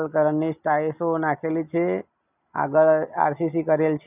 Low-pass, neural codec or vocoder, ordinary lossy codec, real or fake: 3.6 kHz; none; none; real